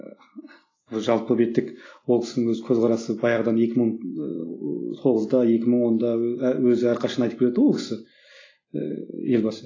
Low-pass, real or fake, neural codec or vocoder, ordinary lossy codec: 7.2 kHz; real; none; AAC, 32 kbps